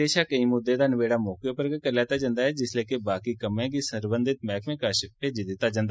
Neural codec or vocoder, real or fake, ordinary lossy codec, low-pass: none; real; none; none